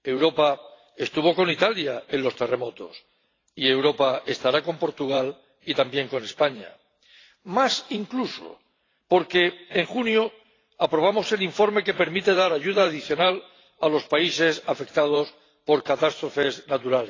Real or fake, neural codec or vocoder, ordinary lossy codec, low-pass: fake; vocoder, 44.1 kHz, 128 mel bands every 512 samples, BigVGAN v2; AAC, 32 kbps; 7.2 kHz